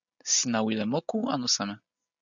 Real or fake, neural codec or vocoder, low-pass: real; none; 7.2 kHz